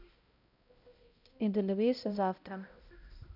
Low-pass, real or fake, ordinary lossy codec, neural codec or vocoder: 5.4 kHz; fake; none; codec, 16 kHz, 0.5 kbps, X-Codec, HuBERT features, trained on balanced general audio